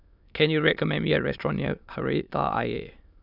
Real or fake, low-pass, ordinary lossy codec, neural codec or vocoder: fake; 5.4 kHz; none; autoencoder, 22.05 kHz, a latent of 192 numbers a frame, VITS, trained on many speakers